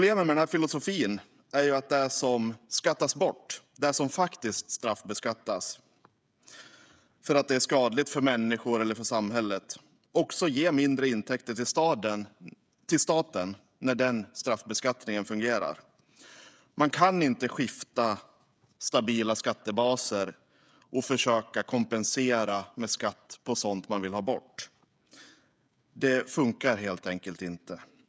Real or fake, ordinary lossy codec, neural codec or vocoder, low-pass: fake; none; codec, 16 kHz, 16 kbps, FreqCodec, smaller model; none